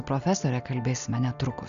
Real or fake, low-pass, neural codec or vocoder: real; 7.2 kHz; none